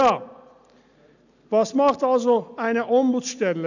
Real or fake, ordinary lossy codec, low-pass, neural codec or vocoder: real; none; 7.2 kHz; none